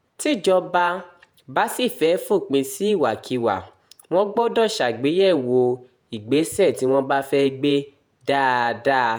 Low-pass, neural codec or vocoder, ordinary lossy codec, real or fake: none; vocoder, 48 kHz, 128 mel bands, Vocos; none; fake